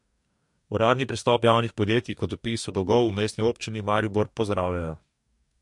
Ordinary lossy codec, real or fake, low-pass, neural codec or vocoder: MP3, 64 kbps; fake; 10.8 kHz; codec, 44.1 kHz, 2.6 kbps, DAC